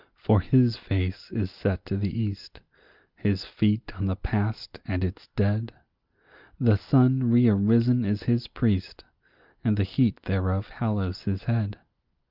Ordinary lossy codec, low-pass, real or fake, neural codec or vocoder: Opus, 32 kbps; 5.4 kHz; real; none